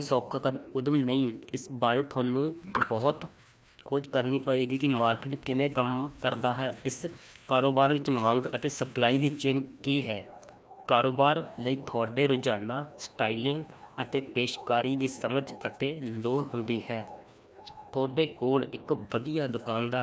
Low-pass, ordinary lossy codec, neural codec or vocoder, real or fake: none; none; codec, 16 kHz, 1 kbps, FreqCodec, larger model; fake